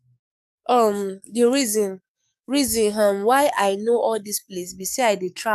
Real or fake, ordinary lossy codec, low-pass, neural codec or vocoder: fake; none; 14.4 kHz; codec, 44.1 kHz, 7.8 kbps, DAC